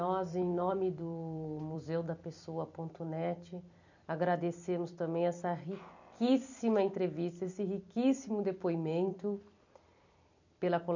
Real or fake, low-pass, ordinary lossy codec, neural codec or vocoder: fake; 7.2 kHz; none; vocoder, 44.1 kHz, 128 mel bands every 256 samples, BigVGAN v2